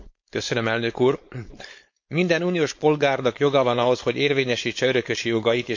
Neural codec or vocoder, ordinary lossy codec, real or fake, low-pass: codec, 16 kHz, 4.8 kbps, FACodec; MP3, 48 kbps; fake; 7.2 kHz